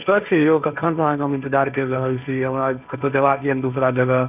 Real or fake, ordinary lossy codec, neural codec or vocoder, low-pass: fake; none; codec, 16 kHz, 1.1 kbps, Voila-Tokenizer; 3.6 kHz